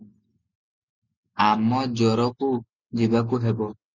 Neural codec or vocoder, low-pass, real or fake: none; 7.2 kHz; real